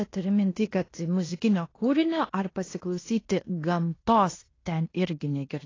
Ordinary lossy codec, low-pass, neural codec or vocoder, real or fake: AAC, 32 kbps; 7.2 kHz; codec, 16 kHz in and 24 kHz out, 0.9 kbps, LongCat-Audio-Codec, fine tuned four codebook decoder; fake